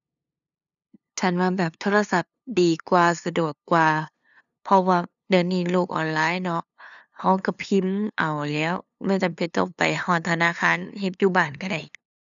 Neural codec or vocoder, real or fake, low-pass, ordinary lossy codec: codec, 16 kHz, 2 kbps, FunCodec, trained on LibriTTS, 25 frames a second; fake; 7.2 kHz; none